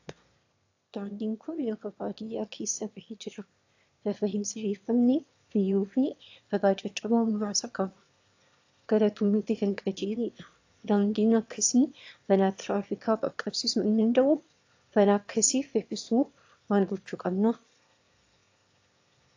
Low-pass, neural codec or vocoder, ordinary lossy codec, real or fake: 7.2 kHz; autoencoder, 22.05 kHz, a latent of 192 numbers a frame, VITS, trained on one speaker; AAC, 48 kbps; fake